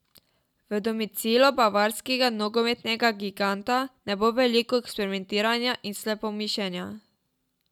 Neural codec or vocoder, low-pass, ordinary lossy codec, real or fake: none; 19.8 kHz; none; real